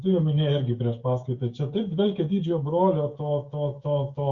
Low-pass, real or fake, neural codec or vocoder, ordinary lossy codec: 7.2 kHz; fake; codec, 16 kHz, 16 kbps, FreqCodec, smaller model; Opus, 64 kbps